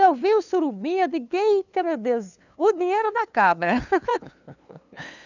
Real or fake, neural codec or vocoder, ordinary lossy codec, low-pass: fake; codec, 16 kHz, 2 kbps, FunCodec, trained on LibriTTS, 25 frames a second; none; 7.2 kHz